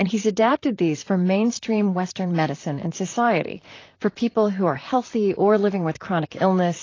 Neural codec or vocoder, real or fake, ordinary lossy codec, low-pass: vocoder, 44.1 kHz, 128 mel bands, Pupu-Vocoder; fake; AAC, 32 kbps; 7.2 kHz